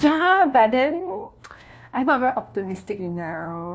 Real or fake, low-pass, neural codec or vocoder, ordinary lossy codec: fake; none; codec, 16 kHz, 1 kbps, FunCodec, trained on LibriTTS, 50 frames a second; none